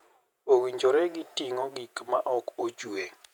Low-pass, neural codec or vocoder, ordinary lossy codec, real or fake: 19.8 kHz; vocoder, 48 kHz, 128 mel bands, Vocos; none; fake